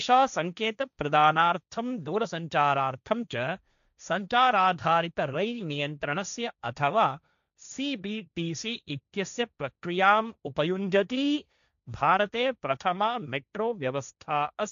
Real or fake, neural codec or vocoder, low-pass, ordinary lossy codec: fake; codec, 16 kHz, 1.1 kbps, Voila-Tokenizer; 7.2 kHz; none